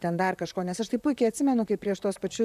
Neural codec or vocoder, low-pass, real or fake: vocoder, 44.1 kHz, 128 mel bands, Pupu-Vocoder; 14.4 kHz; fake